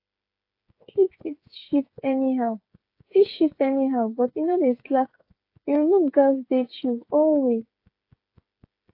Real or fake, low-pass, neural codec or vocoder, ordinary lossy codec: fake; 5.4 kHz; codec, 16 kHz, 8 kbps, FreqCodec, smaller model; AAC, 32 kbps